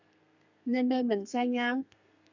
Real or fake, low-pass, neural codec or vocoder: fake; 7.2 kHz; codec, 32 kHz, 1.9 kbps, SNAC